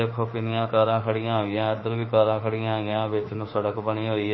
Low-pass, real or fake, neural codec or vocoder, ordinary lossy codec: 7.2 kHz; fake; autoencoder, 48 kHz, 32 numbers a frame, DAC-VAE, trained on Japanese speech; MP3, 24 kbps